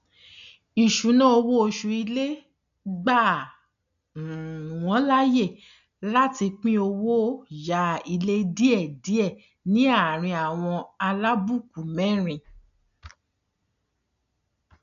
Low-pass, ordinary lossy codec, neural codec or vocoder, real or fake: 7.2 kHz; none; none; real